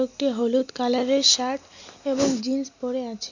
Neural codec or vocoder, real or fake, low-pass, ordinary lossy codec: none; real; 7.2 kHz; MP3, 64 kbps